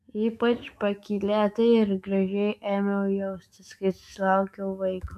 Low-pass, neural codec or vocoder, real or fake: 14.4 kHz; autoencoder, 48 kHz, 128 numbers a frame, DAC-VAE, trained on Japanese speech; fake